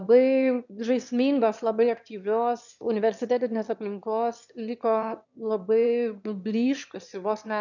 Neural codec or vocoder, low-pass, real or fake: autoencoder, 22.05 kHz, a latent of 192 numbers a frame, VITS, trained on one speaker; 7.2 kHz; fake